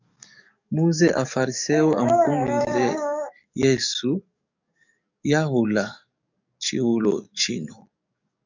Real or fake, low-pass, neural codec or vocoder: fake; 7.2 kHz; codec, 44.1 kHz, 7.8 kbps, DAC